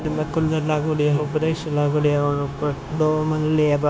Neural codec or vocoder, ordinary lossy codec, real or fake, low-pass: codec, 16 kHz, 0.9 kbps, LongCat-Audio-Codec; none; fake; none